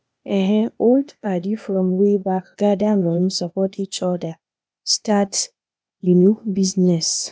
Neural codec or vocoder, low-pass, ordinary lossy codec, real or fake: codec, 16 kHz, 0.8 kbps, ZipCodec; none; none; fake